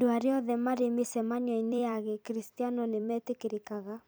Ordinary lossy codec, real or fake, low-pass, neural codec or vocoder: none; fake; none; vocoder, 44.1 kHz, 128 mel bands every 256 samples, BigVGAN v2